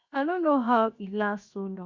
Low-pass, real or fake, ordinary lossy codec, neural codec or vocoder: 7.2 kHz; fake; Opus, 64 kbps; codec, 16 kHz, 0.7 kbps, FocalCodec